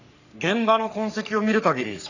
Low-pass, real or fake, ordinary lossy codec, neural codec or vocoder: 7.2 kHz; fake; none; codec, 44.1 kHz, 3.4 kbps, Pupu-Codec